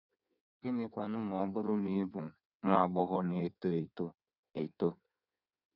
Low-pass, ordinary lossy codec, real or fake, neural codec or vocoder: 5.4 kHz; none; fake; codec, 16 kHz in and 24 kHz out, 1.1 kbps, FireRedTTS-2 codec